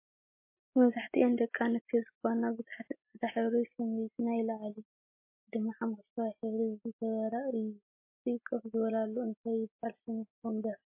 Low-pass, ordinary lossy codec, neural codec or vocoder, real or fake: 3.6 kHz; MP3, 24 kbps; none; real